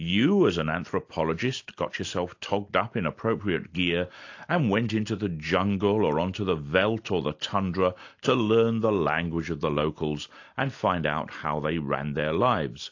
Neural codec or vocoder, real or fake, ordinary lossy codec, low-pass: none; real; AAC, 48 kbps; 7.2 kHz